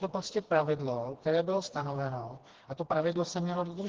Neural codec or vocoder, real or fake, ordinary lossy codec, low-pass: codec, 16 kHz, 2 kbps, FreqCodec, smaller model; fake; Opus, 16 kbps; 7.2 kHz